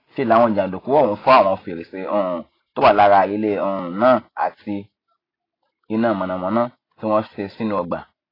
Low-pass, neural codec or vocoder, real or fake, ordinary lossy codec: 5.4 kHz; none; real; AAC, 24 kbps